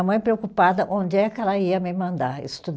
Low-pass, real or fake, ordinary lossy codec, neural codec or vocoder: none; real; none; none